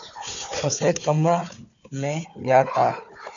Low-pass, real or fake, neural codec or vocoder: 7.2 kHz; fake; codec, 16 kHz, 4 kbps, FunCodec, trained on Chinese and English, 50 frames a second